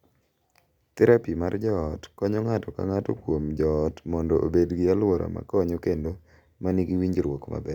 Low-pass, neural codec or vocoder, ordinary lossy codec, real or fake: 19.8 kHz; none; none; real